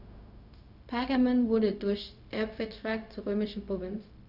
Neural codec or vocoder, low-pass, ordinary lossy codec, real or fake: codec, 16 kHz, 0.4 kbps, LongCat-Audio-Codec; 5.4 kHz; none; fake